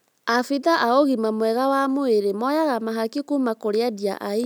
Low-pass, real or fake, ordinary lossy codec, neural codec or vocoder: none; real; none; none